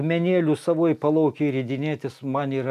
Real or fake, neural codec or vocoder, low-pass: real; none; 14.4 kHz